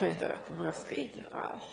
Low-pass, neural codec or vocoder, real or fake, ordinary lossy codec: 9.9 kHz; autoencoder, 22.05 kHz, a latent of 192 numbers a frame, VITS, trained on one speaker; fake; AAC, 32 kbps